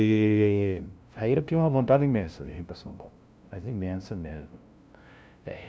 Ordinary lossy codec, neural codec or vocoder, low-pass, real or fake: none; codec, 16 kHz, 0.5 kbps, FunCodec, trained on LibriTTS, 25 frames a second; none; fake